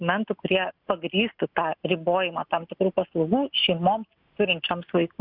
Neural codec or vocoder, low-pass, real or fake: none; 5.4 kHz; real